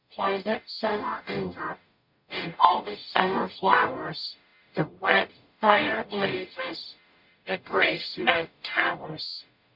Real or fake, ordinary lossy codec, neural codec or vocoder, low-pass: fake; MP3, 48 kbps; codec, 44.1 kHz, 0.9 kbps, DAC; 5.4 kHz